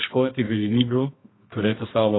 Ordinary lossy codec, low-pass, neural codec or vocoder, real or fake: AAC, 16 kbps; 7.2 kHz; codec, 24 kHz, 0.9 kbps, WavTokenizer, medium music audio release; fake